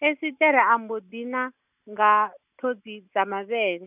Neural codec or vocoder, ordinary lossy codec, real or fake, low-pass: none; none; real; 3.6 kHz